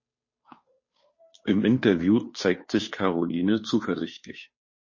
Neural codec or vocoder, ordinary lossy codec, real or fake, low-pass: codec, 16 kHz, 2 kbps, FunCodec, trained on Chinese and English, 25 frames a second; MP3, 32 kbps; fake; 7.2 kHz